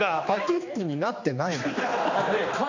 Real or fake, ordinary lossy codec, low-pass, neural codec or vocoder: fake; MP3, 48 kbps; 7.2 kHz; codec, 16 kHz, 2 kbps, X-Codec, HuBERT features, trained on general audio